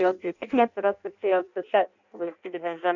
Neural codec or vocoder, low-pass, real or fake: codec, 16 kHz in and 24 kHz out, 0.6 kbps, FireRedTTS-2 codec; 7.2 kHz; fake